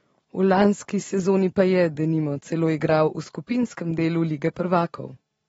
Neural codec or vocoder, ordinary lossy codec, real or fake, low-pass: vocoder, 44.1 kHz, 128 mel bands every 512 samples, BigVGAN v2; AAC, 24 kbps; fake; 19.8 kHz